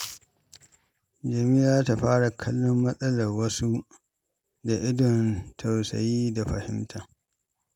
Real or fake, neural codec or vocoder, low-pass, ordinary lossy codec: real; none; 19.8 kHz; none